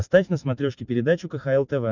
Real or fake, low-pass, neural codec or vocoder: real; 7.2 kHz; none